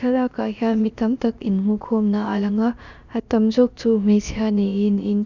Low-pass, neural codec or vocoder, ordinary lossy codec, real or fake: 7.2 kHz; codec, 16 kHz, about 1 kbps, DyCAST, with the encoder's durations; Opus, 64 kbps; fake